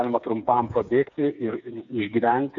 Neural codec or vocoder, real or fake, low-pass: codec, 16 kHz, 4 kbps, FreqCodec, smaller model; fake; 7.2 kHz